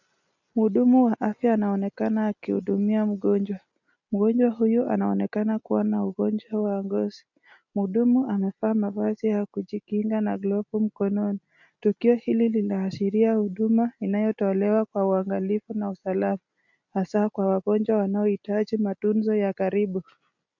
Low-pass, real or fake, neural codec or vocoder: 7.2 kHz; real; none